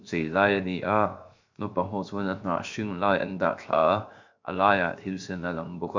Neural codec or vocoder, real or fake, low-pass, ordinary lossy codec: codec, 16 kHz, 0.7 kbps, FocalCodec; fake; 7.2 kHz; MP3, 64 kbps